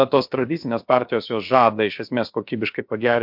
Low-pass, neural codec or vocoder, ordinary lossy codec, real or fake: 5.4 kHz; codec, 16 kHz, about 1 kbps, DyCAST, with the encoder's durations; MP3, 48 kbps; fake